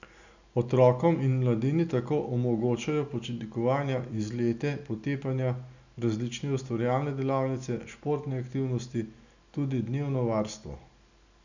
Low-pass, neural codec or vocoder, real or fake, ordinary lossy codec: 7.2 kHz; none; real; none